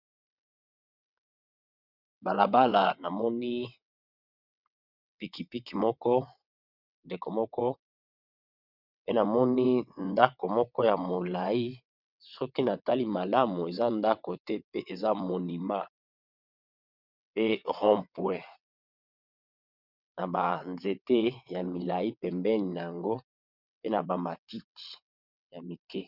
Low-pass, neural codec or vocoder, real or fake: 5.4 kHz; vocoder, 22.05 kHz, 80 mel bands, WaveNeXt; fake